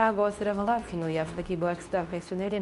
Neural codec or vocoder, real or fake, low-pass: codec, 24 kHz, 0.9 kbps, WavTokenizer, medium speech release version 2; fake; 10.8 kHz